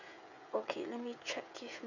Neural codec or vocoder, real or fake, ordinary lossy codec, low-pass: codec, 16 kHz, 16 kbps, FreqCodec, smaller model; fake; none; 7.2 kHz